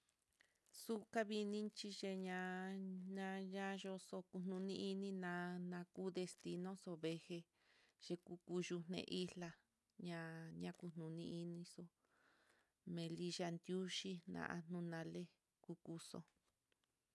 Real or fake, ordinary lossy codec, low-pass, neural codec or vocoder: real; none; none; none